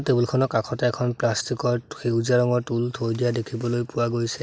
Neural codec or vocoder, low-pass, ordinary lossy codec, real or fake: none; none; none; real